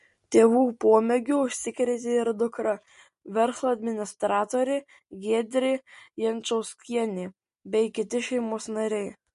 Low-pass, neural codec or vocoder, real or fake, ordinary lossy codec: 10.8 kHz; none; real; MP3, 48 kbps